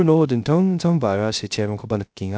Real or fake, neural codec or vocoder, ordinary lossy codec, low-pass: fake; codec, 16 kHz, 0.3 kbps, FocalCodec; none; none